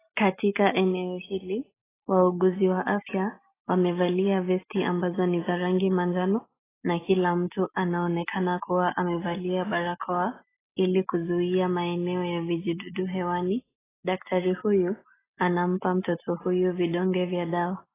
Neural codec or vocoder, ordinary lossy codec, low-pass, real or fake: none; AAC, 16 kbps; 3.6 kHz; real